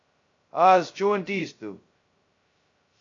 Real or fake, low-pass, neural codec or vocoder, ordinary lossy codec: fake; 7.2 kHz; codec, 16 kHz, 0.2 kbps, FocalCodec; AAC, 48 kbps